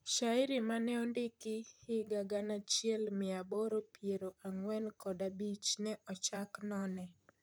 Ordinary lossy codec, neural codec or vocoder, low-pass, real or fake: none; vocoder, 44.1 kHz, 128 mel bands, Pupu-Vocoder; none; fake